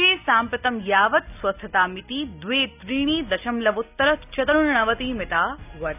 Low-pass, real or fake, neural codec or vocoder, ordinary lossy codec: 3.6 kHz; real; none; none